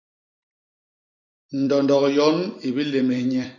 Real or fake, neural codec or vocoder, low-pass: fake; vocoder, 44.1 kHz, 128 mel bands every 256 samples, BigVGAN v2; 7.2 kHz